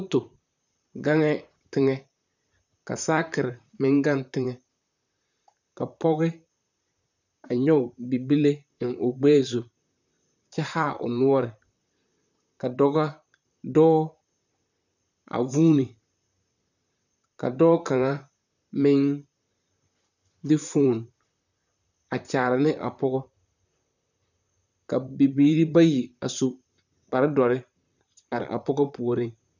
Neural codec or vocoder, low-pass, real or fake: vocoder, 44.1 kHz, 128 mel bands, Pupu-Vocoder; 7.2 kHz; fake